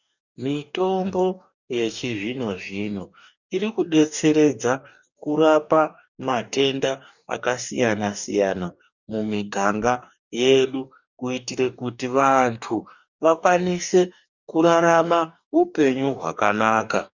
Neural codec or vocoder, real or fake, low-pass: codec, 44.1 kHz, 2.6 kbps, DAC; fake; 7.2 kHz